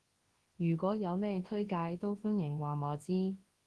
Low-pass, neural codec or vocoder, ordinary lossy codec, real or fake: 10.8 kHz; codec, 24 kHz, 0.9 kbps, WavTokenizer, large speech release; Opus, 16 kbps; fake